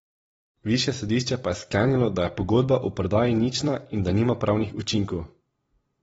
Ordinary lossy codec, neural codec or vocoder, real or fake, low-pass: AAC, 24 kbps; vocoder, 44.1 kHz, 128 mel bands every 256 samples, BigVGAN v2; fake; 19.8 kHz